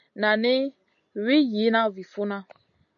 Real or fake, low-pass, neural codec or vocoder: real; 7.2 kHz; none